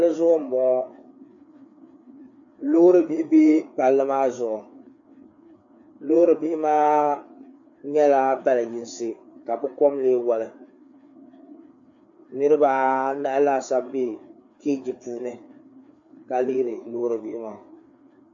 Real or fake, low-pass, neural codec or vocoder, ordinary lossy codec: fake; 7.2 kHz; codec, 16 kHz, 4 kbps, FreqCodec, larger model; AAC, 64 kbps